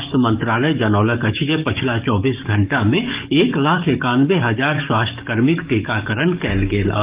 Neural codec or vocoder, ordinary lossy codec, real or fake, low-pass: codec, 44.1 kHz, 7.8 kbps, DAC; Opus, 24 kbps; fake; 3.6 kHz